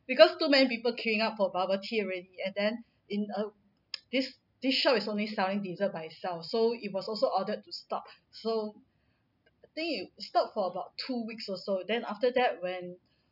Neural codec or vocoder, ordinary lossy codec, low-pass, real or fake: none; none; 5.4 kHz; real